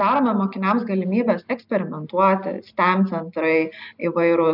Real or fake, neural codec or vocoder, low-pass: real; none; 5.4 kHz